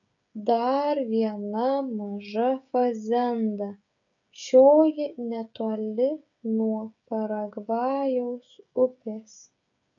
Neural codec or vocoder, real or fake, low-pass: codec, 16 kHz, 16 kbps, FreqCodec, smaller model; fake; 7.2 kHz